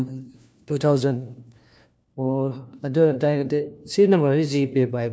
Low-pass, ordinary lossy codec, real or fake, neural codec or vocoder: none; none; fake; codec, 16 kHz, 1 kbps, FunCodec, trained on LibriTTS, 50 frames a second